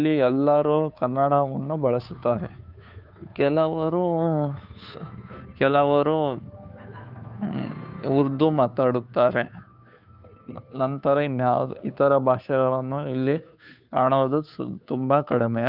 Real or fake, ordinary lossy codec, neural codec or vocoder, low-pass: fake; none; codec, 16 kHz, 2 kbps, FunCodec, trained on Chinese and English, 25 frames a second; 5.4 kHz